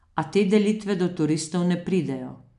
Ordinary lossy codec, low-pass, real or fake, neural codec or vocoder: none; 10.8 kHz; real; none